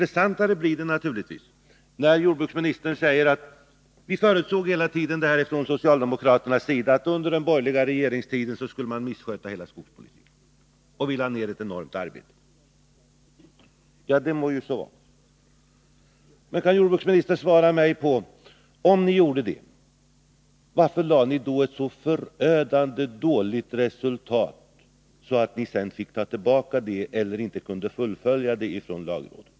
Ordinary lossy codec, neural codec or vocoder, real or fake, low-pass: none; none; real; none